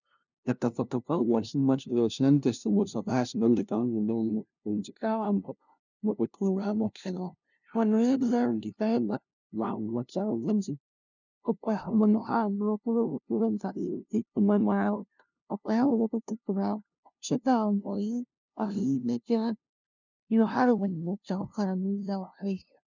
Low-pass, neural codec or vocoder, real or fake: 7.2 kHz; codec, 16 kHz, 0.5 kbps, FunCodec, trained on LibriTTS, 25 frames a second; fake